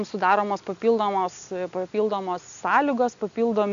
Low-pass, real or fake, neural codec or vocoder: 7.2 kHz; real; none